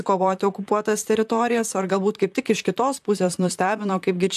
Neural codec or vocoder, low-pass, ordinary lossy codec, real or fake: vocoder, 44.1 kHz, 128 mel bands, Pupu-Vocoder; 14.4 kHz; AAC, 96 kbps; fake